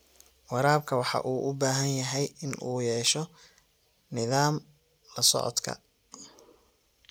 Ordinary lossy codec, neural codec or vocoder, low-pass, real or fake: none; none; none; real